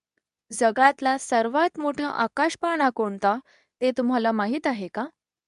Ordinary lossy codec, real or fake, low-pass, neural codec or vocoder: none; fake; 10.8 kHz; codec, 24 kHz, 0.9 kbps, WavTokenizer, medium speech release version 2